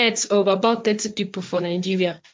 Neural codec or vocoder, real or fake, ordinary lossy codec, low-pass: codec, 16 kHz, 1.1 kbps, Voila-Tokenizer; fake; none; none